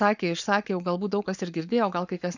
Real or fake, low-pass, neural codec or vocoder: fake; 7.2 kHz; codec, 44.1 kHz, 7.8 kbps, Pupu-Codec